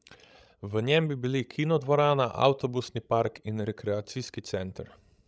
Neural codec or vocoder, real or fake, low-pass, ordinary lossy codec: codec, 16 kHz, 16 kbps, FreqCodec, larger model; fake; none; none